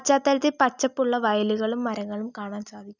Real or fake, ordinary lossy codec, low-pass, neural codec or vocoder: real; none; 7.2 kHz; none